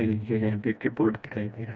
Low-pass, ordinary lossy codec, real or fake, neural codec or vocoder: none; none; fake; codec, 16 kHz, 1 kbps, FreqCodec, smaller model